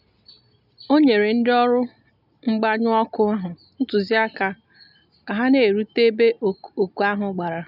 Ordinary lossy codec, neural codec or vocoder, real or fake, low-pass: none; none; real; 5.4 kHz